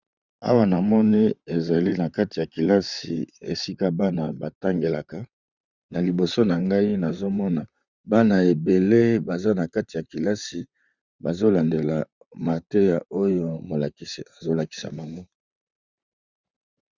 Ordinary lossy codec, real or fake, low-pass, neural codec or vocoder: Opus, 64 kbps; fake; 7.2 kHz; vocoder, 44.1 kHz, 128 mel bands, Pupu-Vocoder